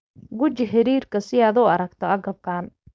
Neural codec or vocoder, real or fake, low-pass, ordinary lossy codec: codec, 16 kHz, 4.8 kbps, FACodec; fake; none; none